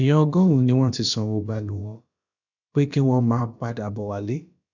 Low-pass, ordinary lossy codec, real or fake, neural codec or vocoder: 7.2 kHz; none; fake; codec, 16 kHz, about 1 kbps, DyCAST, with the encoder's durations